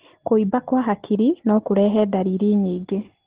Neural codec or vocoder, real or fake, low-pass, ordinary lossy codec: none; real; 3.6 kHz; Opus, 16 kbps